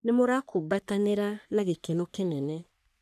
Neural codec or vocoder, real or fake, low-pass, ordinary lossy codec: codec, 44.1 kHz, 3.4 kbps, Pupu-Codec; fake; 14.4 kHz; none